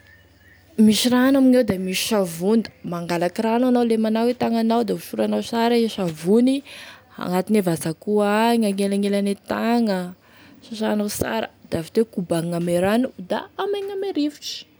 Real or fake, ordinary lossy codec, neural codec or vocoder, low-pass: real; none; none; none